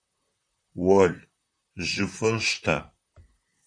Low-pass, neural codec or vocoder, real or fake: 9.9 kHz; vocoder, 44.1 kHz, 128 mel bands, Pupu-Vocoder; fake